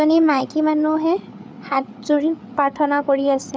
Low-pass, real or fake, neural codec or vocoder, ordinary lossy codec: none; fake; codec, 16 kHz, 8 kbps, FreqCodec, larger model; none